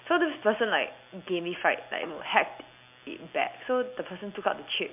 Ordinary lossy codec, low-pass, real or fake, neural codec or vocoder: MP3, 32 kbps; 3.6 kHz; real; none